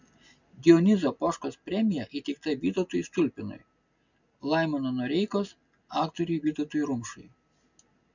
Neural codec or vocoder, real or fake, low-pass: none; real; 7.2 kHz